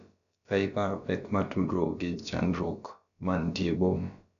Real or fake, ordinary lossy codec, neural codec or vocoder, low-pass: fake; none; codec, 16 kHz, about 1 kbps, DyCAST, with the encoder's durations; 7.2 kHz